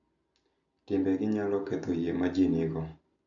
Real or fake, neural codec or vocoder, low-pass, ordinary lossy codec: real; none; 7.2 kHz; none